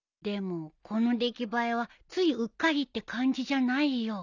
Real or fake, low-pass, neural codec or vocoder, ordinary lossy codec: real; 7.2 kHz; none; none